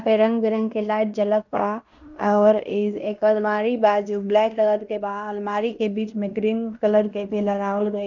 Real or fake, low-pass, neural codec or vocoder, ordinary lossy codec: fake; 7.2 kHz; codec, 16 kHz in and 24 kHz out, 0.9 kbps, LongCat-Audio-Codec, fine tuned four codebook decoder; none